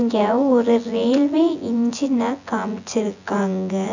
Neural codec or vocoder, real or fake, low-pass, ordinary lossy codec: vocoder, 24 kHz, 100 mel bands, Vocos; fake; 7.2 kHz; none